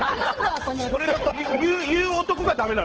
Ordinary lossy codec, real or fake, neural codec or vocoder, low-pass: Opus, 16 kbps; fake; codec, 16 kHz, 8 kbps, FunCodec, trained on Chinese and English, 25 frames a second; 7.2 kHz